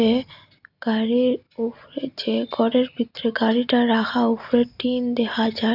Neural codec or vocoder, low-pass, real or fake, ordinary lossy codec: none; 5.4 kHz; real; none